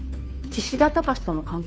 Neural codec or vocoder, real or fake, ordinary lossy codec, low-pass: codec, 16 kHz, 2 kbps, FunCodec, trained on Chinese and English, 25 frames a second; fake; none; none